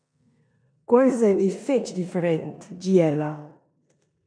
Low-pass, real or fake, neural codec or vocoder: 9.9 kHz; fake; codec, 16 kHz in and 24 kHz out, 0.9 kbps, LongCat-Audio-Codec, four codebook decoder